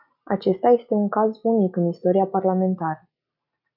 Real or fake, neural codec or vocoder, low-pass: real; none; 5.4 kHz